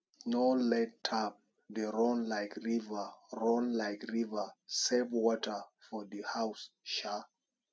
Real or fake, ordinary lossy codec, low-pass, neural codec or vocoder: real; none; none; none